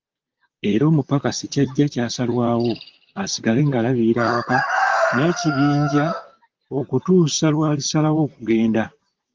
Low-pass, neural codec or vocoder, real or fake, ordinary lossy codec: 7.2 kHz; vocoder, 44.1 kHz, 128 mel bands, Pupu-Vocoder; fake; Opus, 24 kbps